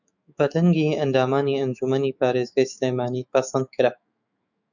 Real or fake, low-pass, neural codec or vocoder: fake; 7.2 kHz; autoencoder, 48 kHz, 128 numbers a frame, DAC-VAE, trained on Japanese speech